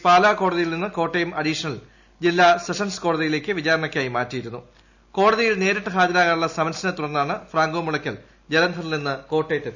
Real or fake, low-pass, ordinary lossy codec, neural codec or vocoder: real; 7.2 kHz; none; none